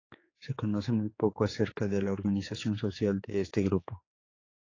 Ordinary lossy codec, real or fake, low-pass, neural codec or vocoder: AAC, 32 kbps; fake; 7.2 kHz; codec, 16 kHz, 4 kbps, X-Codec, HuBERT features, trained on general audio